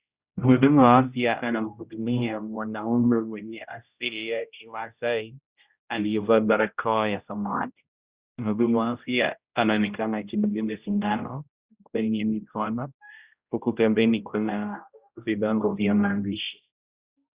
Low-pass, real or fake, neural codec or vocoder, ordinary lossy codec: 3.6 kHz; fake; codec, 16 kHz, 0.5 kbps, X-Codec, HuBERT features, trained on general audio; Opus, 64 kbps